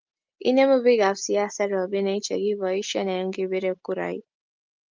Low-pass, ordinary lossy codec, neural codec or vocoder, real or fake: 7.2 kHz; Opus, 24 kbps; none; real